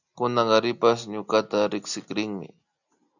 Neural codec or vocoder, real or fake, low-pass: none; real; 7.2 kHz